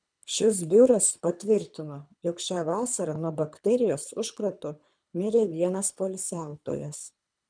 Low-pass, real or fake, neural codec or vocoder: 9.9 kHz; fake; codec, 24 kHz, 3 kbps, HILCodec